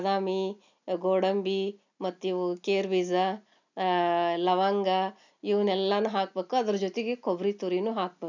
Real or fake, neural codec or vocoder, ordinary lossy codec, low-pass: real; none; none; 7.2 kHz